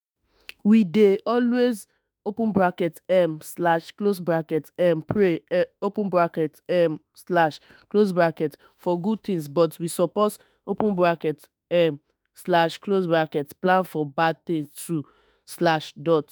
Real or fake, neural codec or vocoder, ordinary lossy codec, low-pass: fake; autoencoder, 48 kHz, 32 numbers a frame, DAC-VAE, trained on Japanese speech; none; none